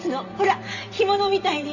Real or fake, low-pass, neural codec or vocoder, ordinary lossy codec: fake; 7.2 kHz; vocoder, 44.1 kHz, 128 mel bands every 512 samples, BigVGAN v2; none